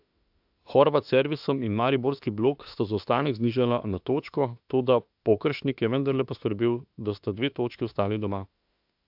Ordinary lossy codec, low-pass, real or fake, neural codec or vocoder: AAC, 48 kbps; 5.4 kHz; fake; autoencoder, 48 kHz, 32 numbers a frame, DAC-VAE, trained on Japanese speech